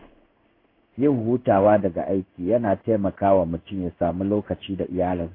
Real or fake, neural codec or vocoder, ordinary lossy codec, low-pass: fake; codec, 16 kHz in and 24 kHz out, 1 kbps, XY-Tokenizer; AAC, 24 kbps; 5.4 kHz